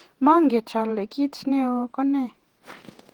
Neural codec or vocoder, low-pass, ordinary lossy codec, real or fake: vocoder, 48 kHz, 128 mel bands, Vocos; 19.8 kHz; Opus, 16 kbps; fake